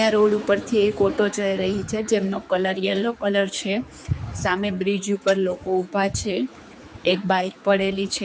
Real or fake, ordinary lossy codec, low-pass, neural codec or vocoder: fake; none; none; codec, 16 kHz, 4 kbps, X-Codec, HuBERT features, trained on general audio